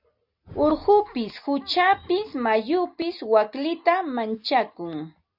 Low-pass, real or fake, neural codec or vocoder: 5.4 kHz; real; none